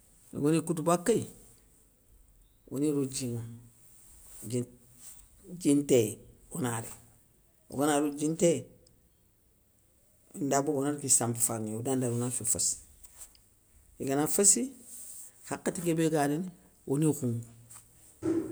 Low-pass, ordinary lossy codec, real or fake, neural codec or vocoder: none; none; real; none